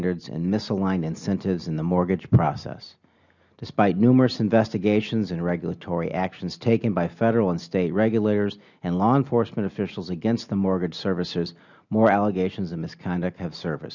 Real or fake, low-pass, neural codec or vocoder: real; 7.2 kHz; none